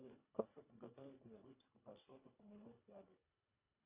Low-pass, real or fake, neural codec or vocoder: 3.6 kHz; fake; codec, 24 kHz, 1.5 kbps, HILCodec